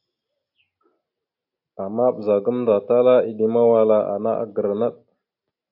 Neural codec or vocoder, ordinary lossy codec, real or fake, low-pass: none; AAC, 48 kbps; real; 5.4 kHz